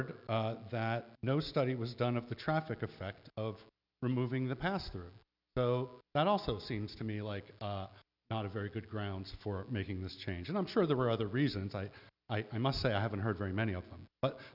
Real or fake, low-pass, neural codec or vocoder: real; 5.4 kHz; none